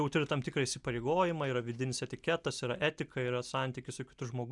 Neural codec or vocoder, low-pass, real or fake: none; 10.8 kHz; real